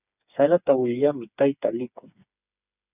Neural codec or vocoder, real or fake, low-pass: codec, 16 kHz, 4 kbps, FreqCodec, smaller model; fake; 3.6 kHz